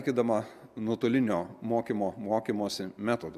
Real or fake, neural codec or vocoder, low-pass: real; none; 14.4 kHz